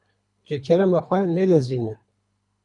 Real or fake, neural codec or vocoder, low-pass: fake; codec, 24 kHz, 3 kbps, HILCodec; 10.8 kHz